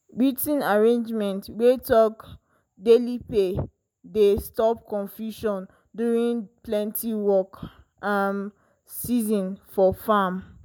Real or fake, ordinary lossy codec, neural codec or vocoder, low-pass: real; none; none; none